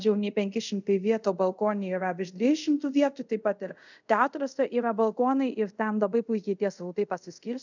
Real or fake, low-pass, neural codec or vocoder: fake; 7.2 kHz; codec, 24 kHz, 0.5 kbps, DualCodec